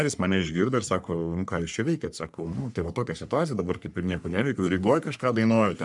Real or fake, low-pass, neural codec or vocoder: fake; 10.8 kHz; codec, 44.1 kHz, 3.4 kbps, Pupu-Codec